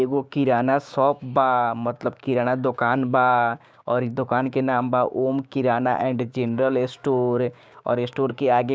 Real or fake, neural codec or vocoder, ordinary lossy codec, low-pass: fake; codec, 16 kHz, 6 kbps, DAC; none; none